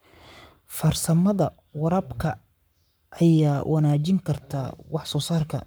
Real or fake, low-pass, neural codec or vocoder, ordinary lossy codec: fake; none; codec, 44.1 kHz, 7.8 kbps, Pupu-Codec; none